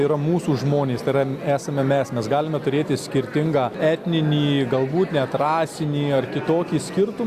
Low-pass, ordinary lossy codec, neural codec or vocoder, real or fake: 14.4 kHz; Opus, 64 kbps; none; real